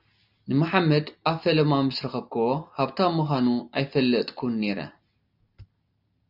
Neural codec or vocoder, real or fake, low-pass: none; real; 5.4 kHz